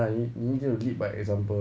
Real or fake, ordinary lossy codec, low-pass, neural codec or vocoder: real; none; none; none